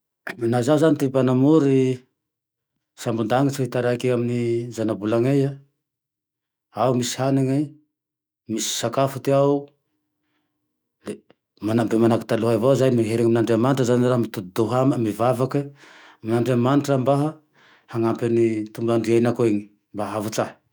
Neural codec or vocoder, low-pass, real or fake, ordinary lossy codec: autoencoder, 48 kHz, 128 numbers a frame, DAC-VAE, trained on Japanese speech; none; fake; none